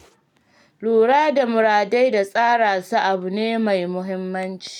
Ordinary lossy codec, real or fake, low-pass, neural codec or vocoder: none; real; 19.8 kHz; none